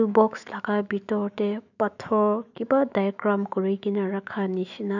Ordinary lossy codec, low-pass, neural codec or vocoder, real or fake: none; 7.2 kHz; autoencoder, 48 kHz, 128 numbers a frame, DAC-VAE, trained on Japanese speech; fake